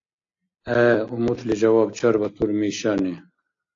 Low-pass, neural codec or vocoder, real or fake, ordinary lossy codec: 7.2 kHz; none; real; AAC, 48 kbps